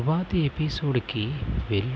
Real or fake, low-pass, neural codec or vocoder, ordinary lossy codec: real; none; none; none